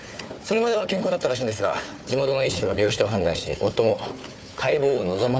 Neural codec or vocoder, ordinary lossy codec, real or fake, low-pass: codec, 16 kHz, 4 kbps, FunCodec, trained on Chinese and English, 50 frames a second; none; fake; none